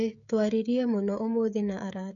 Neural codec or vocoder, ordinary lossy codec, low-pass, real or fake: codec, 16 kHz, 16 kbps, FreqCodec, smaller model; none; 7.2 kHz; fake